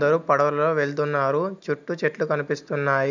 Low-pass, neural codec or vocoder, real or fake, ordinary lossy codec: 7.2 kHz; none; real; none